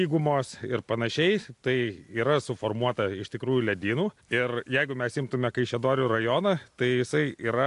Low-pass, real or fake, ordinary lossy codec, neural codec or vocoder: 10.8 kHz; real; AAC, 64 kbps; none